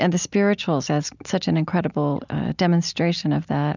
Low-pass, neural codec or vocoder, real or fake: 7.2 kHz; none; real